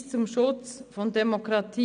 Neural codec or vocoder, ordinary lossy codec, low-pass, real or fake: none; none; 9.9 kHz; real